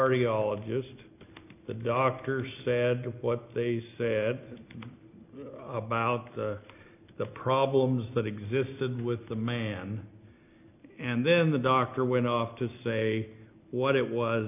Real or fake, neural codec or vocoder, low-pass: real; none; 3.6 kHz